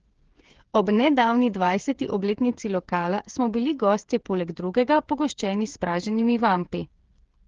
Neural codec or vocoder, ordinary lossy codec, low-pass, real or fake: codec, 16 kHz, 8 kbps, FreqCodec, smaller model; Opus, 16 kbps; 7.2 kHz; fake